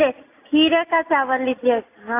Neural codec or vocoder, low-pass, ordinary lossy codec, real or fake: none; 3.6 kHz; AAC, 24 kbps; real